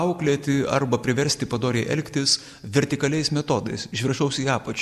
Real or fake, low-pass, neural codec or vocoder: real; 14.4 kHz; none